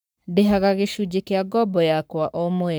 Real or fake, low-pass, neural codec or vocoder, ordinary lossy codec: fake; none; codec, 44.1 kHz, 7.8 kbps, Pupu-Codec; none